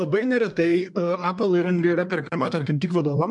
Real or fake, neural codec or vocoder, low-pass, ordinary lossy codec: fake; codec, 24 kHz, 1 kbps, SNAC; 10.8 kHz; MP3, 64 kbps